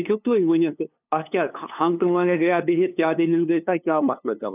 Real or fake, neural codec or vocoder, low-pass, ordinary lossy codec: fake; codec, 16 kHz, 2 kbps, FunCodec, trained on LibriTTS, 25 frames a second; 3.6 kHz; none